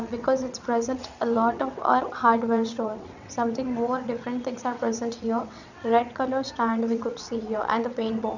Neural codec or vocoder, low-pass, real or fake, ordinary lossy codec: vocoder, 22.05 kHz, 80 mel bands, WaveNeXt; 7.2 kHz; fake; none